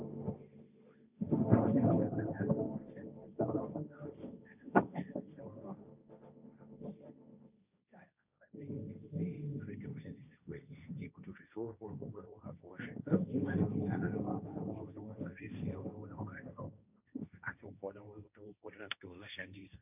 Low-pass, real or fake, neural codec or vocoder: 3.6 kHz; fake; codec, 16 kHz, 1.1 kbps, Voila-Tokenizer